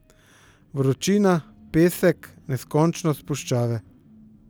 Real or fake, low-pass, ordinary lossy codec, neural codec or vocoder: real; none; none; none